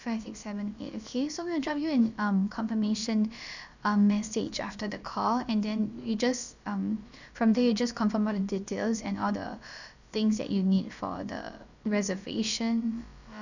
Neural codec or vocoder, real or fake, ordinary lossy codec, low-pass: codec, 16 kHz, about 1 kbps, DyCAST, with the encoder's durations; fake; none; 7.2 kHz